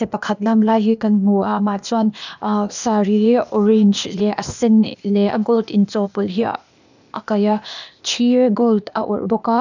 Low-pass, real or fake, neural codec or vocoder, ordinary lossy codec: 7.2 kHz; fake; codec, 16 kHz, 0.8 kbps, ZipCodec; none